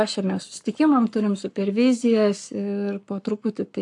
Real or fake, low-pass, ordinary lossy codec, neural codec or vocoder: fake; 10.8 kHz; AAC, 64 kbps; codec, 44.1 kHz, 7.8 kbps, Pupu-Codec